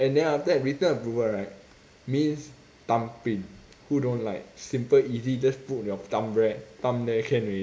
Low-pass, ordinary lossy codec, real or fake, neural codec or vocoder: none; none; real; none